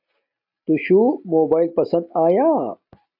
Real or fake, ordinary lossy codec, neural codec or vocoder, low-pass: real; AAC, 48 kbps; none; 5.4 kHz